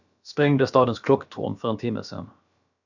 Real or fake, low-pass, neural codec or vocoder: fake; 7.2 kHz; codec, 16 kHz, about 1 kbps, DyCAST, with the encoder's durations